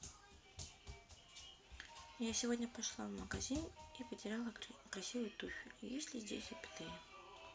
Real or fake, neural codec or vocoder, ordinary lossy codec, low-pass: real; none; none; none